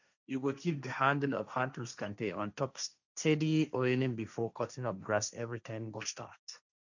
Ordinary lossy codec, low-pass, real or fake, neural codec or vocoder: none; 7.2 kHz; fake; codec, 16 kHz, 1.1 kbps, Voila-Tokenizer